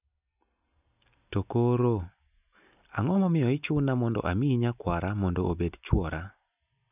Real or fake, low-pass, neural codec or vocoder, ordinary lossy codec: real; 3.6 kHz; none; none